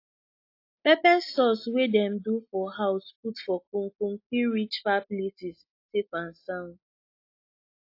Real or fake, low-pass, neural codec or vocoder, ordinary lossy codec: real; 5.4 kHz; none; AAC, 32 kbps